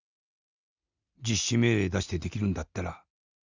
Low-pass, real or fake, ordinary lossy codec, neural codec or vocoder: 7.2 kHz; real; Opus, 64 kbps; none